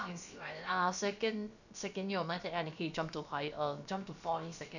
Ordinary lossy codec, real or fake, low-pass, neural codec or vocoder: none; fake; 7.2 kHz; codec, 16 kHz, about 1 kbps, DyCAST, with the encoder's durations